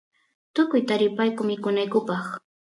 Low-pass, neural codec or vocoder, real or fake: 10.8 kHz; none; real